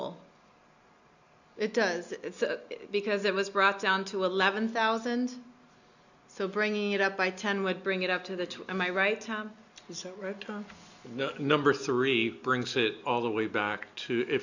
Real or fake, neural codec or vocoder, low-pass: real; none; 7.2 kHz